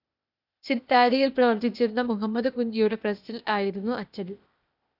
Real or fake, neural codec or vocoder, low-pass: fake; codec, 16 kHz, 0.8 kbps, ZipCodec; 5.4 kHz